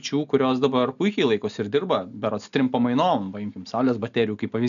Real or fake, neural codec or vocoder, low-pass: real; none; 7.2 kHz